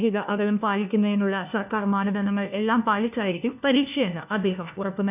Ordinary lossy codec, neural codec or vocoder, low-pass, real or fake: none; codec, 16 kHz, 1 kbps, FunCodec, trained on LibriTTS, 50 frames a second; 3.6 kHz; fake